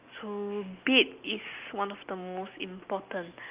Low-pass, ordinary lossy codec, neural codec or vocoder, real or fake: 3.6 kHz; Opus, 32 kbps; none; real